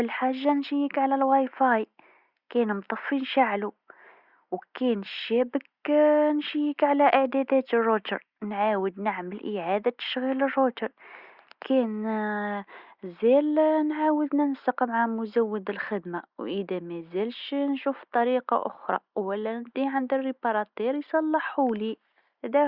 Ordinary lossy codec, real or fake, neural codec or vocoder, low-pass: Opus, 64 kbps; real; none; 5.4 kHz